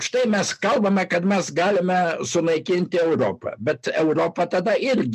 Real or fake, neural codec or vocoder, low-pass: real; none; 14.4 kHz